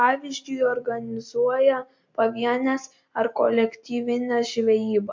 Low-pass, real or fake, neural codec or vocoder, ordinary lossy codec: 7.2 kHz; real; none; MP3, 48 kbps